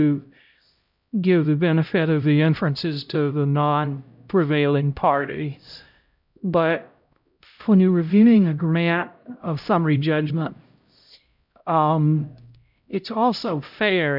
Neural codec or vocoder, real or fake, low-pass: codec, 16 kHz, 0.5 kbps, X-Codec, HuBERT features, trained on LibriSpeech; fake; 5.4 kHz